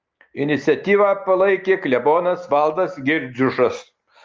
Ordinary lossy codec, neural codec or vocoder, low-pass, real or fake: Opus, 32 kbps; none; 7.2 kHz; real